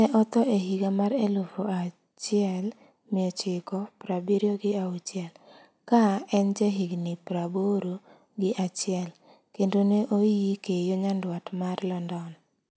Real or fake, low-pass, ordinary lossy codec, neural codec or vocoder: real; none; none; none